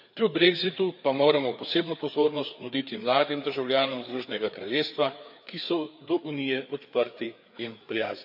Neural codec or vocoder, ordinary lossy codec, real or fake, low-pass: codec, 16 kHz, 4 kbps, FreqCodec, larger model; none; fake; 5.4 kHz